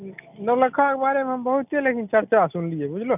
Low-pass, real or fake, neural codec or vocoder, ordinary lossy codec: 3.6 kHz; real; none; none